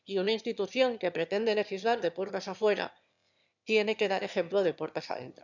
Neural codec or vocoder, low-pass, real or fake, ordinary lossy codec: autoencoder, 22.05 kHz, a latent of 192 numbers a frame, VITS, trained on one speaker; 7.2 kHz; fake; none